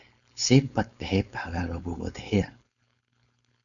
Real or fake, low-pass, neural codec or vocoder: fake; 7.2 kHz; codec, 16 kHz, 4.8 kbps, FACodec